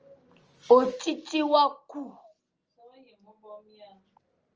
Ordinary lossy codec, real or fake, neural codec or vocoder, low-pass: Opus, 24 kbps; real; none; 7.2 kHz